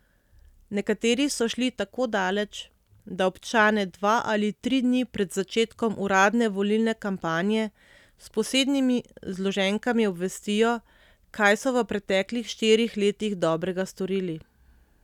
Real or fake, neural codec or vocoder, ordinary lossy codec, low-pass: real; none; none; 19.8 kHz